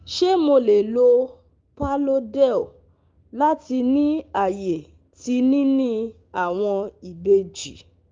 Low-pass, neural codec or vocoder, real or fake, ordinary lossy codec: 7.2 kHz; codec, 16 kHz, 6 kbps, DAC; fake; Opus, 32 kbps